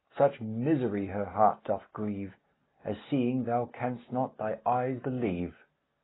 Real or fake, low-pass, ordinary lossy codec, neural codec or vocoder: real; 7.2 kHz; AAC, 16 kbps; none